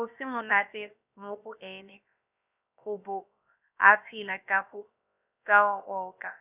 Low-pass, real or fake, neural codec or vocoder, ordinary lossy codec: 3.6 kHz; fake; codec, 16 kHz, about 1 kbps, DyCAST, with the encoder's durations; none